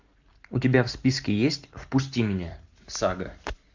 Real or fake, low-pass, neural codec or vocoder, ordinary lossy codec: real; 7.2 kHz; none; MP3, 64 kbps